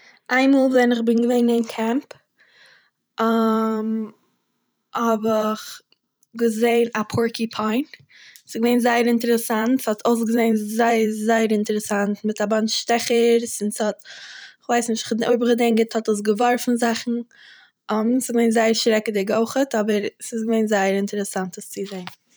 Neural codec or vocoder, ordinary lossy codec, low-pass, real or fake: vocoder, 44.1 kHz, 128 mel bands every 512 samples, BigVGAN v2; none; none; fake